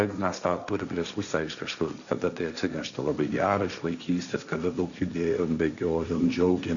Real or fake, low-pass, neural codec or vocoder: fake; 7.2 kHz; codec, 16 kHz, 1.1 kbps, Voila-Tokenizer